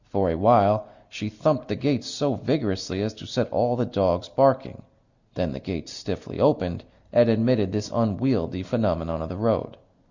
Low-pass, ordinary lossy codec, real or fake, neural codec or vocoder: 7.2 kHz; Opus, 64 kbps; real; none